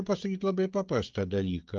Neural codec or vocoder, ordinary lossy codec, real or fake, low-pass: codec, 16 kHz, 16 kbps, FreqCodec, smaller model; Opus, 32 kbps; fake; 7.2 kHz